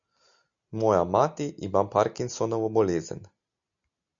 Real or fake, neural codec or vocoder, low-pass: real; none; 7.2 kHz